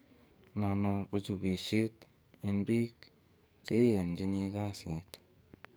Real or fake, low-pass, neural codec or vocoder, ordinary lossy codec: fake; none; codec, 44.1 kHz, 2.6 kbps, SNAC; none